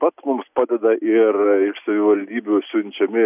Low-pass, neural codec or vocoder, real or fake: 3.6 kHz; none; real